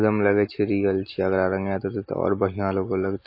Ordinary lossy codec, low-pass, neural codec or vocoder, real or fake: MP3, 24 kbps; 5.4 kHz; none; real